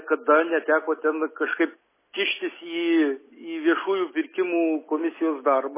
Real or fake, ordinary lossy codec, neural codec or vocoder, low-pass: real; MP3, 16 kbps; none; 3.6 kHz